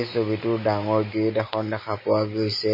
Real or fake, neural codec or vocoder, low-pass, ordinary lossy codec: real; none; 5.4 kHz; MP3, 24 kbps